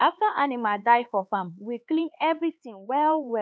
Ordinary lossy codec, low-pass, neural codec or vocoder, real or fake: none; none; codec, 16 kHz, 4 kbps, X-Codec, HuBERT features, trained on LibriSpeech; fake